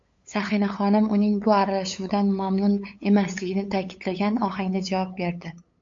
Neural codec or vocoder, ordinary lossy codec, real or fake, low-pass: codec, 16 kHz, 8 kbps, FunCodec, trained on LibriTTS, 25 frames a second; MP3, 48 kbps; fake; 7.2 kHz